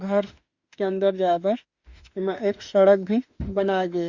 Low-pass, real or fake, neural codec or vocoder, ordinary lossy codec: 7.2 kHz; fake; autoencoder, 48 kHz, 32 numbers a frame, DAC-VAE, trained on Japanese speech; Opus, 64 kbps